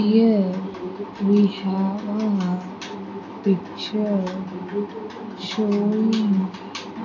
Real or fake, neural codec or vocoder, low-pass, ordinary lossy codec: real; none; 7.2 kHz; AAC, 48 kbps